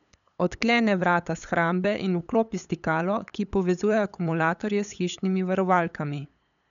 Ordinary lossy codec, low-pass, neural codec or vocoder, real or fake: none; 7.2 kHz; codec, 16 kHz, 8 kbps, FunCodec, trained on LibriTTS, 25 frames a second; fake